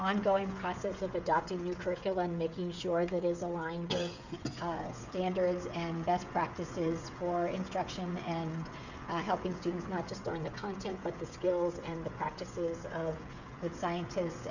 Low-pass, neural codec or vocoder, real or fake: 7.2 kHz; codec, 16 kHz, 16 kbps, FreqCodec, smaller model; fake